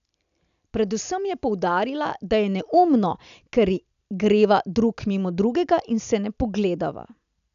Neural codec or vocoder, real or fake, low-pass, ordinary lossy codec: none; real; 7.2 kHz; none